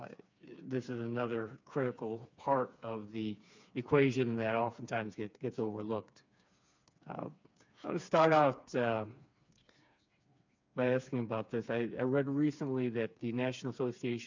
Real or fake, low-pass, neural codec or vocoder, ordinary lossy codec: fake; 7.2 kHz; codec, 16 kHz, 4 kbps, FreqCodec, smaller model; MP3, 64 kbps